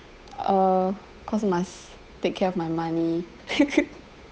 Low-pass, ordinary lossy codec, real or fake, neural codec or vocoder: none; none; fake; codec, 16 kHz, 8 kbps, FunCodec, trained on Chinese and English, 25 frames a second